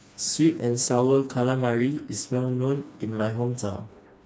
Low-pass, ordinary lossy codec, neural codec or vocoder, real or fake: none; none; codec, 16 kHz, 2 kbps, FreqCodec, smaller model; fake